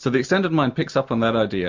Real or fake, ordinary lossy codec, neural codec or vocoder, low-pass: real; MP3, 64 kbps; none; 7.2 kHz